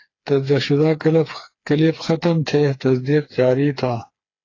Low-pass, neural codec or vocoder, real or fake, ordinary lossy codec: 7.2 kHz; codec, 16 kHz, 4 kbps, FreqCodec, smaller model; fake; AAC, 32 kbps